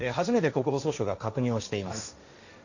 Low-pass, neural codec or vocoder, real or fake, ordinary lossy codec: 7.2 kHz; codec, 16 kHz, 1.1 kbps, Voila-Tokenizer; fake; none